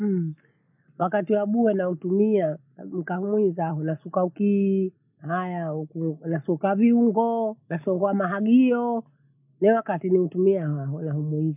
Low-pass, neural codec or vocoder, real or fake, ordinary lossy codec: 3.6 kHz; none; real; none